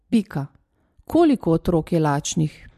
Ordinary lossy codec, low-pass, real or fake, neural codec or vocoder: MP3, 64 kbps; 14.4 kHz; real; none